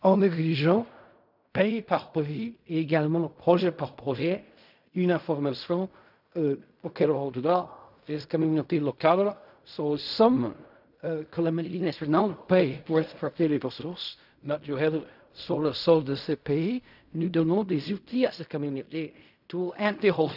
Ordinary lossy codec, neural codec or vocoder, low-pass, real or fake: none; codec, 16 kHz in and 24 kHz out, 0.4 kbps, LongCat-Audio-Codec, fine tuned four codebook decoder; 5.4 kHz; fake